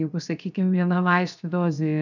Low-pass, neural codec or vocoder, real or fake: 7.2 kHz; codec, 16 kHz, 0.7 kbps, FocalCodec; fake